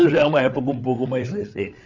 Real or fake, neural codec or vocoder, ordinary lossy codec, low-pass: fake; codec, 16 kHz, 8 kbps, FreqCodec, larger model; none; 7.2 kHz